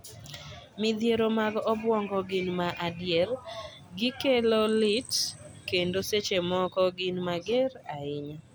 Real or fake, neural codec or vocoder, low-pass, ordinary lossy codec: real; none; none; none